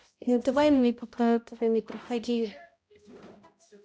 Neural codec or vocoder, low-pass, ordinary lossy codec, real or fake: codec, 16 kHz, 0.5 kbps, X-Codec, HuBERT features, trained on balanced general audio; none; none; fake